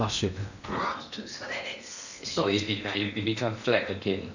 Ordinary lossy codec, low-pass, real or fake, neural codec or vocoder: none; 7.2 kHz; fake; codec, 16 kHz in and 24 kHz out, 0.8 kbps, FocalCodec, streaming, 65536 codes